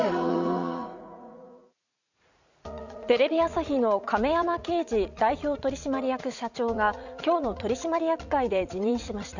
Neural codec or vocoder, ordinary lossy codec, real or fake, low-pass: vocoder, 44.1 kHz, 80 mel bands, Vocos; none; fake; 7.2 kHz